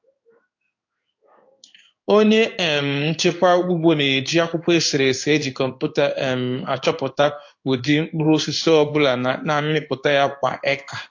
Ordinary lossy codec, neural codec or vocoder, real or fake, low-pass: AAC, 48 kbps; codec, 16 kHz in and 24 kHz out, 1 kbps, XY-Tokenizer; fake; 7.2 kHz